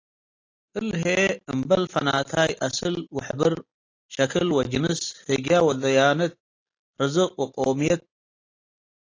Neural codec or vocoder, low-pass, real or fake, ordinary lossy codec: none; 7.2 kHz; real; AAC, 32 kbps